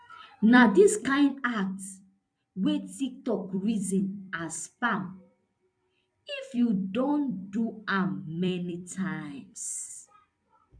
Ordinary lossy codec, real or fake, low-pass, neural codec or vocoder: MP3, 64 kbps; fake; 9.9 kHz; vocoder, 44.1 kHz, 128 mel bands every 256 samples, BigVGAN v2